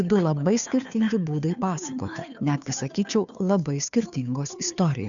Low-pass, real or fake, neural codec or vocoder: 7.2 kHz; fake; codec, 16 kHz, 2 kbps, FunCodec, trained on Chinese and English, 25 frames a second